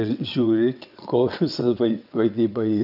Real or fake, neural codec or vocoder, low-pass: fake; vocoder, 22.05 kHz, 80 mel bands, WaveNeXt; 5.4 kHz